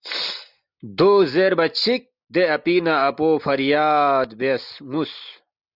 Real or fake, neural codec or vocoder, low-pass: real; none; 5.4 kHz